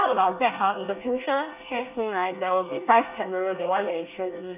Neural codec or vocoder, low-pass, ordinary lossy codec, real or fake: codec, 24 kHz, 1 kbps, SNAC; 3.6 kHz; none; fake